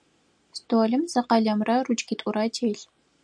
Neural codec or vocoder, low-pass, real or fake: none; 9.9 kHz; real